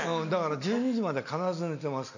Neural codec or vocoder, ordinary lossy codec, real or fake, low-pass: none; none; real; 7.2 kHz